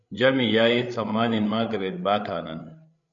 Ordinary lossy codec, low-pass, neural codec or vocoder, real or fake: MP3, 96 kbps; 7.2 kHz; codec, 16 kHz, 8 kbps, FreqCodec, larger model; fake